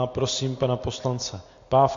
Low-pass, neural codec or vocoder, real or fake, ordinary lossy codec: 7.2 kHz; none; real; AAC, 48 kbps